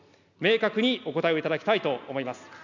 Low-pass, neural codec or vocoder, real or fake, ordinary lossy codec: 7.2 kHz; none; real; none